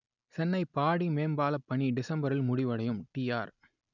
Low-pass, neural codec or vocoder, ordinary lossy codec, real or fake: 7.2 kHz; none; none; real